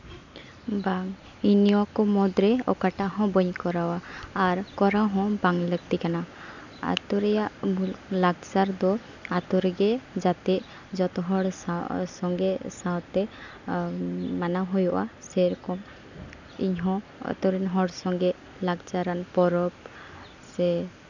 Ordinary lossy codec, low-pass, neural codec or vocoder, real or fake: none; 7.2 kHz; none; real